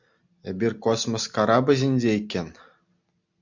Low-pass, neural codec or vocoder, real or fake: 7.2 kHz; none; real